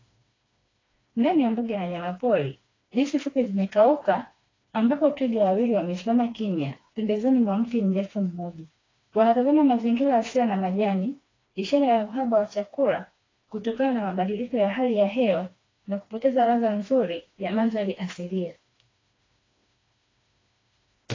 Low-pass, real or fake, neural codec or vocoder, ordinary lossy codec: 7.2 kHz; fake; codec, 16 kHz, 2 kbps, FreqCodec, smaller model; AAC, 32 kbps